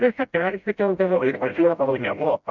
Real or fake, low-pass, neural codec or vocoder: fake; 7.2 kHz; codec, 16 kHz, 0.5 kbps, FreqCodec, smaller model